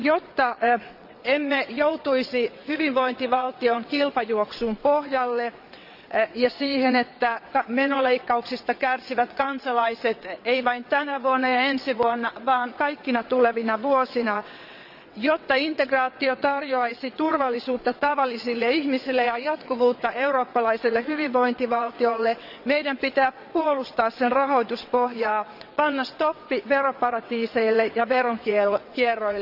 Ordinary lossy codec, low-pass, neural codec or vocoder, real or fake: none; 5.4 kHz; vocoder, 22.05 kHz, 80 mel bands, WaveNeXt; fake